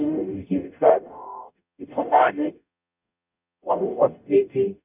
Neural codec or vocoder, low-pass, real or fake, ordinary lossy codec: codec, 44.1 kHz, 0.9 kbps, DAC; 3.6 kHz; fake; none